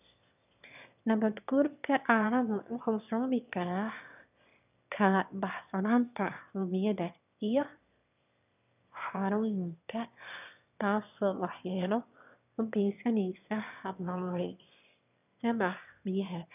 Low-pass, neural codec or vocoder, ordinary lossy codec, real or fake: 3.6 kHz; autoencoder, 22.05 kHz, a latent of 192 numbers a frame, VITS, trained on one speaker; none; fake